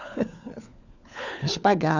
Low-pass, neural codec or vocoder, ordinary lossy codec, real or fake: 7.2 kHz; codec, 16 kHz, 4 kbps, FunCodec, trained on LibriTTS, 50 frames a second; none; fake